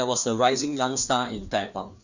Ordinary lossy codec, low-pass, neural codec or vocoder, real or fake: none; 7.2 kHz; codec, 16 kHz, 2 kbps, FreqCodec, larger model; fake